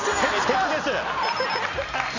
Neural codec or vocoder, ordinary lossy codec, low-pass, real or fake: none; none; 7.2 kHz; real